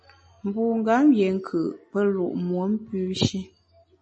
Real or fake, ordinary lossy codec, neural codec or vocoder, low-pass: real; MP3, 32 kbps; none; 10.8 kHz